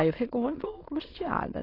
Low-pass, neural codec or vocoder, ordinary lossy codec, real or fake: 5.4 kHz; autoencoder, 22.05 kHz, a latent of 192 numbers a frame, VITS, trained on many speakers; AAC, 24 kbps; fake